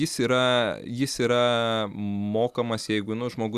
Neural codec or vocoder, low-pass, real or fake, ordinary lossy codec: none; 14.4 kHz; real; Opus, 64 kbps